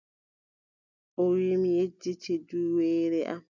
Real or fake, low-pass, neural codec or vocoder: real; 7.2 kHz; none